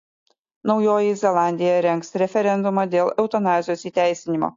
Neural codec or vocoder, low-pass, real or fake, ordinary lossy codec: none; 7.2 kHz; real; AAC, 48 kbps